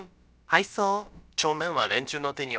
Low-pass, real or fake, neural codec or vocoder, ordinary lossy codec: none; fake; codec, 16 kHz, about 1 kbps, DyCAST, with the encoder's durations; none